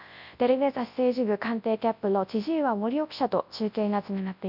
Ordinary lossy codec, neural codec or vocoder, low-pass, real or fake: none; codec, 24 kHz, 0.9 kbps, WavTokenizer, large speech release; 5.4 kHz; fake